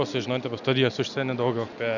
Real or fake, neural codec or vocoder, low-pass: real; none; 7.2 kHz